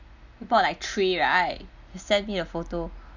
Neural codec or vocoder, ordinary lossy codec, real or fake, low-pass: none; none; real; 7.2 kHz